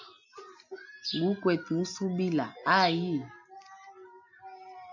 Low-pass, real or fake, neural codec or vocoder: 7.2 kHz; real; none